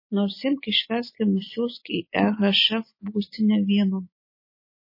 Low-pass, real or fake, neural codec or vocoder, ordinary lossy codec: 5.4 kHz; real; none; MP3, 24 kbps